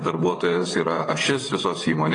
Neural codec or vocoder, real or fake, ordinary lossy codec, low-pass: vocoder, 22.05 kHz, 80 mel bands, WaveNeXt; fake; AAC, 32 kbps; 9.9 kHz